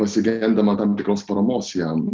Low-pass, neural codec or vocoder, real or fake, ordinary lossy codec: 7.2 kHz; none; real; Opus, 24 kbps